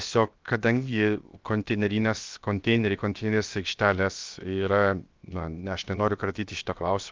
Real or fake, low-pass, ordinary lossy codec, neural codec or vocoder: fake; 7.2 kHz; Opus, 32 kbps; codec, 16 kHz, 0.7 kbps, FocalCodec